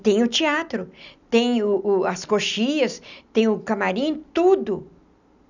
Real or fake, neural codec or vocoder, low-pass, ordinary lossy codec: real; none; 7.2 kHz; none